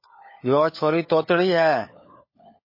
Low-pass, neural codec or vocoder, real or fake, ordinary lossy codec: 5.4 kHz; codec, 16 kHz, 4 kbps, FunCodec, trained on LibriTTS, 50 frames a second; fake; MP3, 24 kbps